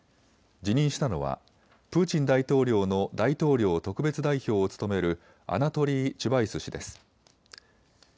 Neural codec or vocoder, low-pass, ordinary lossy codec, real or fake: none; none; none; real